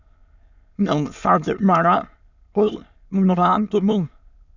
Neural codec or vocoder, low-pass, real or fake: autoencoder, 22.05 kHz, a latent of 192 numbers a frame, VITS, trained on many speakers; 7.2 kHz; fake